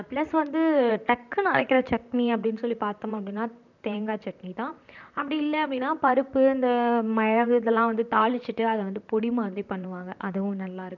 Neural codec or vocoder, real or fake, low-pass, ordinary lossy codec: vocoder, 44.1 kHz, 128 mel bands, Pupu-Vocoder; fake; 7.2 kHz; none